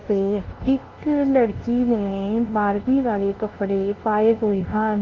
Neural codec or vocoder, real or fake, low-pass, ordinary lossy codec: codec, 16 kHz, 0.5 kbps, FunCodec, trained on LibriTTS, 25 frames a second; fake; 7.2 kHz; Opus, 16 kbps